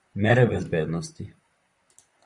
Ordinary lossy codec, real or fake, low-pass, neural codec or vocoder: Opus, 64 kbps; fake; 10.8 kHz; vocoder, 44.1 kHz, 128 mel bands, Pupu-Vocoder